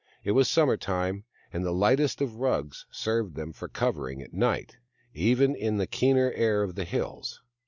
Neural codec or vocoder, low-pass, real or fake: none; 7.2 kHz; real